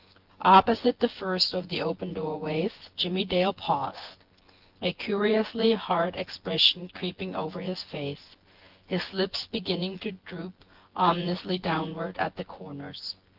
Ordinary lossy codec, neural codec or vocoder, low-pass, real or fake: Opus, 16 kbps; vocoder, 24 kHz, 100 mel bands, Vocos; 5.4 kHz; fake